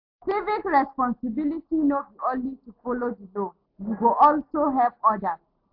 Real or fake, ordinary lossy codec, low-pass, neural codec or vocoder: real; AAC, 48 kbps; 5.4 kHz; none